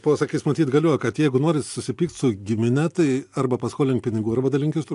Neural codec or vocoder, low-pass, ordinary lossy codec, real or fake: none; 10.8 kHz; AAC, 64 kbps; real